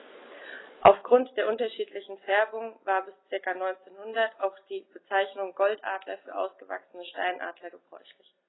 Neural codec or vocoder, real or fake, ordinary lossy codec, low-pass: none; real; AAC, 16 kbps; 7.2 kHz